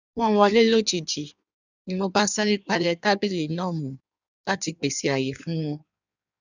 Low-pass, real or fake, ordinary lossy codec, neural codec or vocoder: 7.2 kHz; fake; none; codec, 16 kHz in and 24 kHz out, 1.1 kbps, FireRedTTS-2 codec